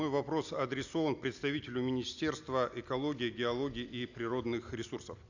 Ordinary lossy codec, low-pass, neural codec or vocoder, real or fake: none; 7.2 kHz; none; real